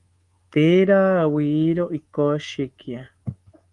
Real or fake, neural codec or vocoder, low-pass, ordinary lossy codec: fake; codec, 24 kHz, 3.1 kbps, DualCodec; 10.8 kHz; Opus, 24 kbps